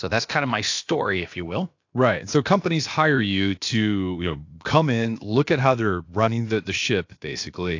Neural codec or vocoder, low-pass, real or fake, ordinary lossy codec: codec, 16 kHz, about 1 kbps, DyCAST, with the encoder's durations; 7.2 kHz; fake; AAC, 48 kbps